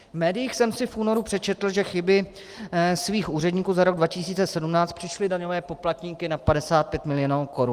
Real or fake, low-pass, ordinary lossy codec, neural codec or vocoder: real; 14.4 kHz; Opus, 24 kbps; none